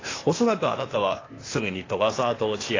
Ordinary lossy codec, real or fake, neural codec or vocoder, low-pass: AAC, 32 kbps; fake; codec, 16 kHz, 0.8 kbps, ZipCodec; 7.2 kHz